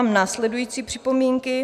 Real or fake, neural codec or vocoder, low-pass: real; none; 14.4 kHz